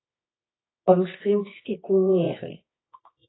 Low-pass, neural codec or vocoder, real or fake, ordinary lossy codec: 7.2 kHz; codec, 24 kHz, 0.9 kbps, WavTokenizer, medium music audio release; fake; AAC, 16 kbps